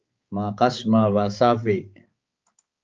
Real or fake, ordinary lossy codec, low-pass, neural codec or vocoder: fake; Opus, 24 kbps; 7.2 kHz; codec, 16 kHz, 4 kbps, X-Codec, HuBERT features, trained on balanced general audio